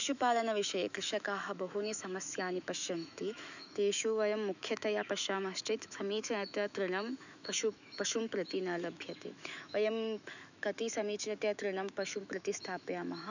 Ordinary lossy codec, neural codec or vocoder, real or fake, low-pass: none; autoencoder, 48 kHz, 128 numbers a frame, DAC-VAE, trained on Japanese speech; fake; 7.2 kHz